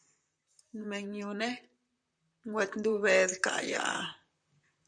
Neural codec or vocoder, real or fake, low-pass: vocoder, 44.1 kHz, 128 mel bands, Pupu-Vocoder; fake; 9.9 kHz